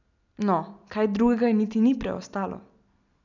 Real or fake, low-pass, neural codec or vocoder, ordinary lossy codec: real; 7.2 kHz; none; none